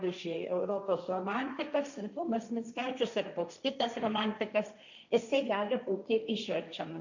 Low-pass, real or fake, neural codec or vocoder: 7.2 kHz; fake; codec, 16 kHz, 1.1 kbps, Voila-Tokenizer